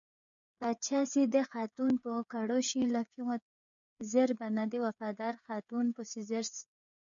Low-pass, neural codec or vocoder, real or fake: 7.2 kHz; codec, 16 kHz, 8 kbps, FreqCodec, smaller model; fake